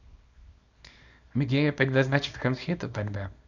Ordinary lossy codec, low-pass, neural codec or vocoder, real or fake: none; 7.2 kHz; codec, 24 kHz, 0.9 kbps, WavTokenizer, small release; fake